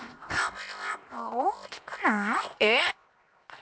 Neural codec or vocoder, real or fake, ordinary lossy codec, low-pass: codec, 16 kHz, 0.7 kbps, FocalCodec; fake; none; none